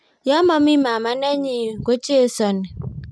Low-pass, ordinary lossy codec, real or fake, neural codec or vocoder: none; none; real; none